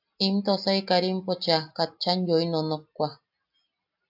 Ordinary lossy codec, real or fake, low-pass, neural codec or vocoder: AAC, 48 kbps; real; 5.4 kHz; none